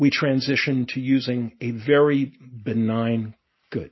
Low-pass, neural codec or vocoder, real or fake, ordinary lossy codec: 7.2 kHz; none; real; MP3, 24 kbps